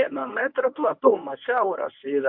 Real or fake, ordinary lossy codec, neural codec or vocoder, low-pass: fake; MP3, 48 kbps; codec, 24 kHz, 0.9 kbps, WavTokenizer, medium speech release version 1; 5.4 kHz